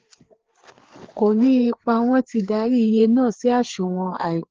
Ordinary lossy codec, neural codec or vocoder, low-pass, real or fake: Opus, 16 kbps; codec, 16 kHz, 4 kbps, FreqCodec, larger model; 7.2 kHz; fake